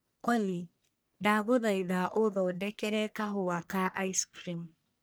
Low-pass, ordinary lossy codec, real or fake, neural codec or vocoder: none; none; fake; codec, 44.1 kHz, 1.7 kbps, Pupu-Codec